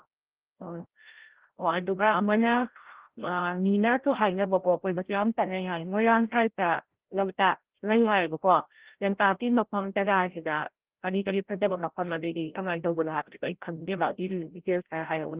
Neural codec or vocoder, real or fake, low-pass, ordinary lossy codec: codec, 16 kHz, 0.5 kbps, FreqCodec, larger model; fake; 3.6 kHz; Opus, 16 kbps